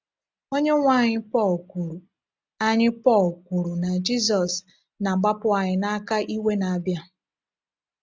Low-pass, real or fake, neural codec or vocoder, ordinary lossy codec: none; real; none; none